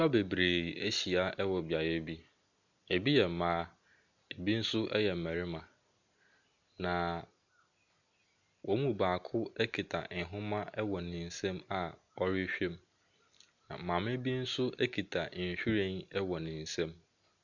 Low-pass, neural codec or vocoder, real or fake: 7.2 kHz; none; real